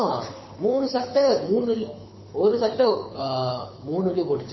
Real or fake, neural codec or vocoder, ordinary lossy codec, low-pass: fake; codec, 24 kHz, 6 kbps, HILCodec; MP3, 24 kbps; 7.2 kHz